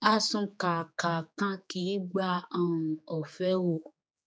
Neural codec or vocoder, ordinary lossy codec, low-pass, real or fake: codec, 16 kHz, 4 kbps, X-Codec, HuBERT features, trained on general audio; none; none; fake